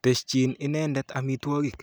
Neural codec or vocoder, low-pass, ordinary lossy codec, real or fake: none; none; none; real